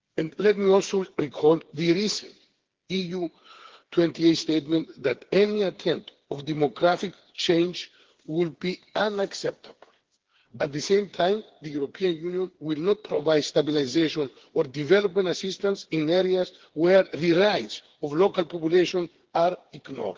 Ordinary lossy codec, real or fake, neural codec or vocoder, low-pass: Opus, 16 kbps; fake; codec, 16 kHz, 4 kbps, FreqCodec, smaller model; 7.2 kHz